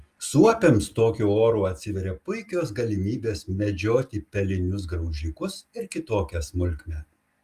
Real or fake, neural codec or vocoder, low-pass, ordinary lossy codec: real; none; 14.4 kHz; Opus, 32 kbps